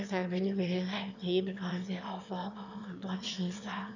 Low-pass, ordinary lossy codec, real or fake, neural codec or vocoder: 7.2 kHz; none; fake; autoencoder, 22.05 kHz, a latent of 192 numbers a frame, VITS, trained on one speaker